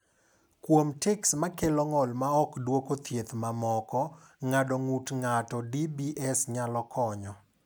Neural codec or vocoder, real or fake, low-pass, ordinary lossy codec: none; real; none; none